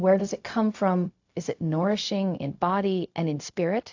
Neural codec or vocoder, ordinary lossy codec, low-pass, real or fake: codec, 16 kHz, 0.4 kbps, LongCat-Audio-Codec; AAC, 48 kbps; 7.2 kHz; fake